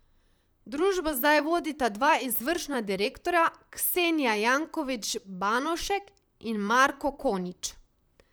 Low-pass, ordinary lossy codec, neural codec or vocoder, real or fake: none; none; vocoder, 44.1 kHz, 128 mel bands, Pupu-Vocoder; fake